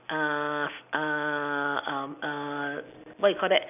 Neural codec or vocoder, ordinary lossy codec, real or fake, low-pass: none; none; real; 3.6 kHz